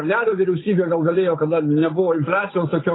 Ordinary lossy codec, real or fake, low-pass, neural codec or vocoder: AAC, 16 kbps; fake; 7.2 kHz; codec, 16 kHz, 8 kbps, FunCodec, trained on Chinese and English, 25 frames a second